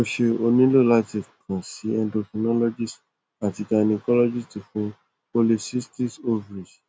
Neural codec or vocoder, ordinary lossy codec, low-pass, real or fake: none; none; none; real